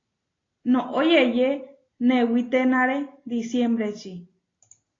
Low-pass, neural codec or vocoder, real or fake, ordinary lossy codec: 7.2 kHz; none; real; AAC, 32 kbps